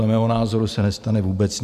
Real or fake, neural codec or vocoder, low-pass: real; none; 14.4 kHz